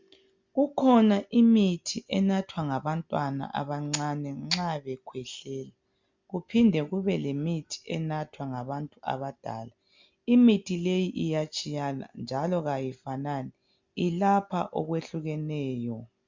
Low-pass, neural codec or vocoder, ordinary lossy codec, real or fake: 7.2 kHz; none; MP3, 64 kbps; real